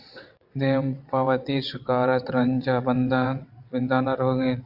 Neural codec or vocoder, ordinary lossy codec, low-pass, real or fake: vocoder, 22.05 kHz, 80 mel bands, WaveNeXt; AAC, 48 kbps; 5.4 kHz; fake